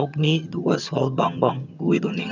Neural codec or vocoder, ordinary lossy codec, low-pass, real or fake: vocoder, 22.05 kHz, 80 mel bands, HiFi-GAN; none; 7.2 kHz; fake